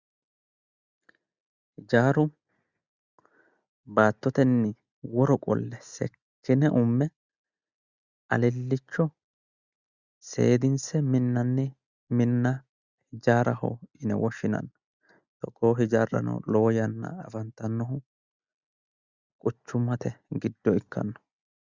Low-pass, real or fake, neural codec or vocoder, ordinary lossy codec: 7.2 kHz; real; none; Opus, 64 kbps